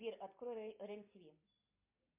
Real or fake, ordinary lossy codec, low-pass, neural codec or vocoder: real; MP3, 32 kbps; 3.6 kHz; none